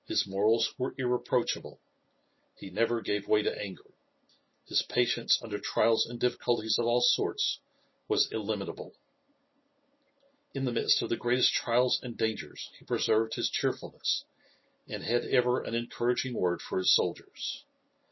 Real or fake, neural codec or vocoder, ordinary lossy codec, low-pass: real; none; MP3, 24 kbps; 7.2 kHz